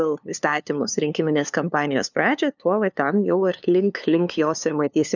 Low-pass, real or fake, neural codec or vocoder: 7.2 kHz; fake; codec, 16 kHz, 2 kbps, FunCodec, trained on LibriTTS, 25 frames a second